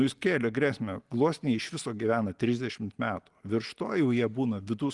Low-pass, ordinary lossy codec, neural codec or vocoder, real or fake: 10.8 kHz; Opus, 24 kbps; none; real